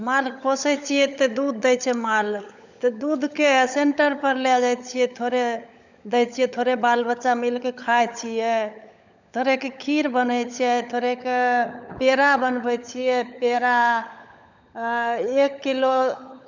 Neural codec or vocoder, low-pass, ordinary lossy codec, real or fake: codec, 16 kHz, 16 kbps, FunCodec, trained on LibriTTS, 50 frames a second; 7.2 kHz; none; fake